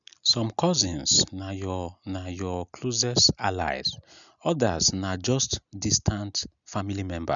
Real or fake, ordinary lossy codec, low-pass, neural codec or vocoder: real; none; 7.2 kHz; none